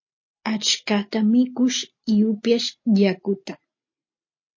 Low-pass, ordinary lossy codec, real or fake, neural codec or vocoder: 7.2 kHz; MP3, 32 kbps; real; none